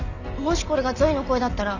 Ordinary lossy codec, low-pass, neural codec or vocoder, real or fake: none; 7.2 kHz; none; real